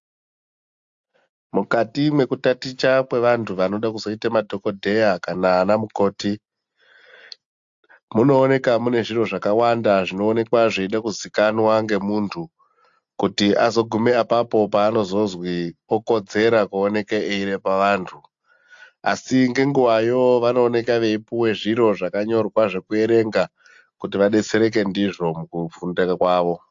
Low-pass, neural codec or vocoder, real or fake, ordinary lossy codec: 7.2 kHz; none; real; AAC, 64 kbps